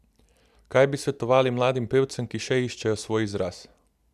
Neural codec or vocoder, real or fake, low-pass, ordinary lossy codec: none; real; 14.4 kHz; none